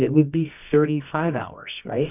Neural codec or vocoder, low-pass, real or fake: codec, 24 kHz, 0.9 kbps, WavTokenizer, medium music audio release; 3.6 kHz; fake